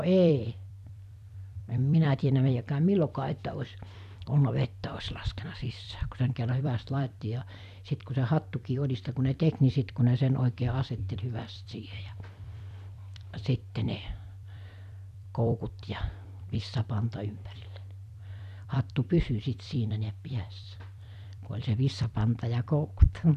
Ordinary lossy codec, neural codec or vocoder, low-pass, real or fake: none; vocoder, 44.1 kHz, 128 mel bands every 256 samples, BigVGAN v2; 14.4 kHz; fake